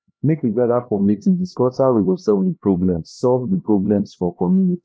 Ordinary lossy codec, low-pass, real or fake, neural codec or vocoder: none; none; fake; codec, 16 kHz, 1 kbps, X-Codec, HuBERT features, trained on LibriSpeech